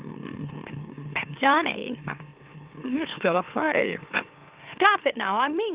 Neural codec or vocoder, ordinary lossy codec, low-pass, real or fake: autoencoder, 44.1 kHz, a latent of 192 numbers a frame, MeloTTS; Opus, 24 kbps; 3.6 kHz; fake